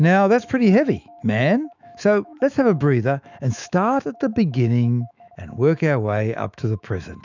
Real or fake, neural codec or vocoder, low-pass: fake; autoencoder, 48 kHz, 128 numbers a frame, DAC-VAE, trained on Japanese speech; 7.2 kHz